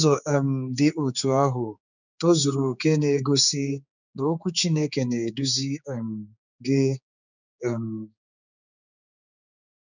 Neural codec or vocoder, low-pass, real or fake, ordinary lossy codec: codec, 16 kHz, 4 kbps, X-Codec, HuBERT features, trained on general audio; 7.2 kHz; fake; none